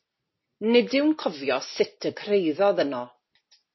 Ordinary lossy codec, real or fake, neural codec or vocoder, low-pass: MP3, 24 kbps; real; none; 7.2 kHz